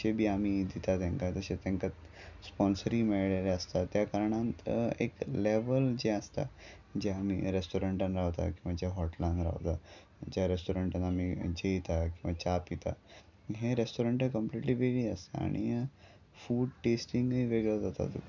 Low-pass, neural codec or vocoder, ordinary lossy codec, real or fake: 7.2 kHz; none; none; real